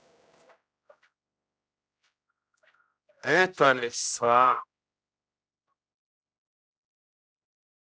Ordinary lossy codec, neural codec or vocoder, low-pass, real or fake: none; codec, 16 kHz, 0.5 kbps, X-Codec, HuBERT features, trained on general audio; none; fake